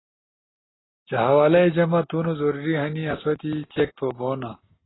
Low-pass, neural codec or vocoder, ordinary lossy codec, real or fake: 7.2 kHz; none; AAC, 16 kbps; real